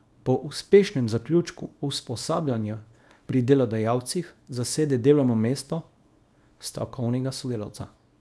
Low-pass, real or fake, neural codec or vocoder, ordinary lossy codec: none; fake; codec, 24 kHz, 0.9 kbps, WavTokenizer, small release; none